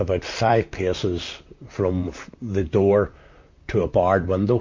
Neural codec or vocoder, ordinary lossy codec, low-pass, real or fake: vocoder, 44.1 kHz, 128 mel bands, Pupu-Vocoder; MP3, 48 kbps; 7.2 kHz; fake